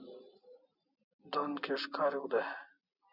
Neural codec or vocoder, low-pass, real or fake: none; 5.4 kHz; real